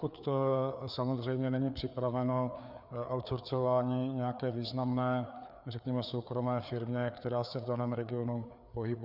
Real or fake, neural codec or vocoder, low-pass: fake; codec, 16 kHz, 4 kbps, FreqCodec, larger model; 5.4 kHz